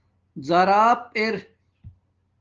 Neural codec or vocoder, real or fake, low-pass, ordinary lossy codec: none; real; 7.2 kHz; Opus, 16 kbps